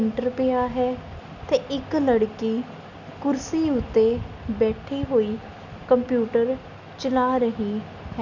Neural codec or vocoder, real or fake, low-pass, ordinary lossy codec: none; real; 7.2 kHz; none